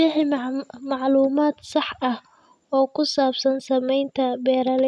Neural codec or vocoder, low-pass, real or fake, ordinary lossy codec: none; none; real; none